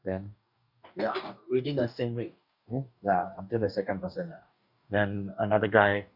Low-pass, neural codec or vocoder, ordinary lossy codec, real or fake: 5.4 kHz; codec, 44.1 kHz, 2.6 kbps, DAC; none; fake